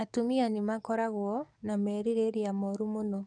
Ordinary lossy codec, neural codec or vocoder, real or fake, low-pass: none; codec, 44.1 kHz, 7.8 kbps, DAC; fake; 9.9 kHz